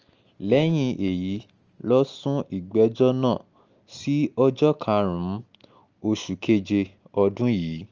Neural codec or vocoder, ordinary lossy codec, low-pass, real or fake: none; Opus, 24 kbps; 7.2 kHz; real